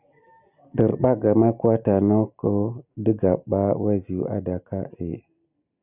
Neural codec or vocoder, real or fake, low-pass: none; real; 3.6 kHz